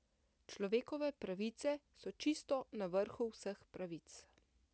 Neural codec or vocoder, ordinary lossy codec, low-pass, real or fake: none; none; none; real